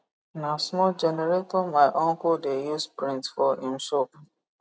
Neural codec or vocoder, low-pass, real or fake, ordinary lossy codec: none; none; real; none